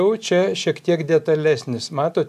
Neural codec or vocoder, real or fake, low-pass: none; real; 14.4 kHz